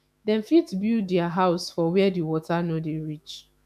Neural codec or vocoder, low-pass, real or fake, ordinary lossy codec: autoencoder, 48 kHz, 128 numbers a frame, DAC-VAE, trained on Japanese speech; 14.4 kHz; fake; none